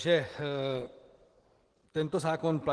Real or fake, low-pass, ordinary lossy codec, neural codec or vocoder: real; 9.9 kHz; Opus, 16 kbps; none